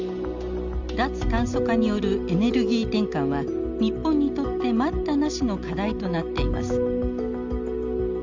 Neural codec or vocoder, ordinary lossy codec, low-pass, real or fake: none; Opus, 32 kbps; 7.2 kHz; real